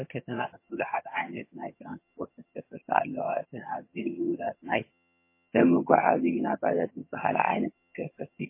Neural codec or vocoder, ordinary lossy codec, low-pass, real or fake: vocoder, 22.05 kHz, 80 mel bands, HiFi-GAN; MP3, 24 kbps; 3.6 kHz; fake